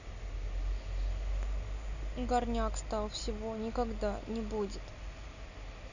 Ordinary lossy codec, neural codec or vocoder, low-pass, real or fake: none; none; 7.2 kHz; real